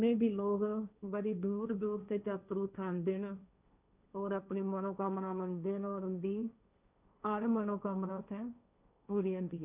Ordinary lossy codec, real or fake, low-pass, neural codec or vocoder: none; fake; 3.6 kHz; codec, 16 kHz, 1.1 kbps, Voila-Tokenizer